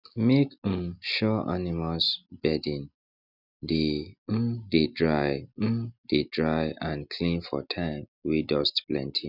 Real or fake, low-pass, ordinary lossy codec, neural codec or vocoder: real; 5.4 kHz; none; none